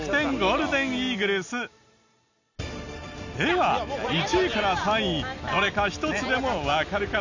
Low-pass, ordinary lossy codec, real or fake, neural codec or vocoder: 7.2 kHz; none; real; none